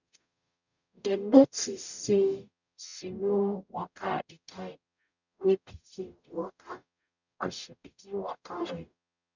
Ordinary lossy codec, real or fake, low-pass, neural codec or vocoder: none; fake; 7.2 kHz; codec, 44.1 kHz, 0.9 kbps, DAC